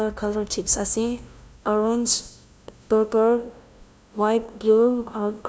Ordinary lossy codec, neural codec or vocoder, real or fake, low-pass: none; codec, 16 kHz, 0.5 kbps, FunCodec, trained on LibriTTS, 25 frames a second; fake; none